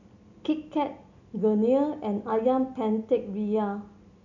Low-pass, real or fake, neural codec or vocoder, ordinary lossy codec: 7.2 kHz; real; none; none